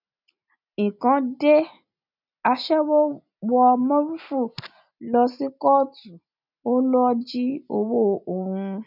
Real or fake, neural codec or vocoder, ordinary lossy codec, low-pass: real; none; none; 5.4 kHz